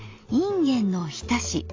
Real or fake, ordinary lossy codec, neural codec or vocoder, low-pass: real; AAC, 32 kbps; none; 7.2 kHz